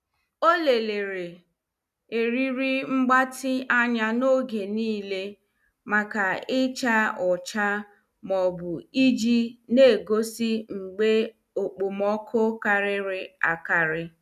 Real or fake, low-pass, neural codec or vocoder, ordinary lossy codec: real; 14.4 kHz; none; none